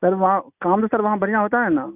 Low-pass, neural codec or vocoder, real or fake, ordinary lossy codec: 3.6 kHz; none; real; none